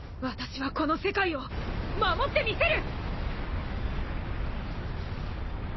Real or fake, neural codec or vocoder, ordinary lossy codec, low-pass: real; none; MP3, 24 kbps; 7.2 kHz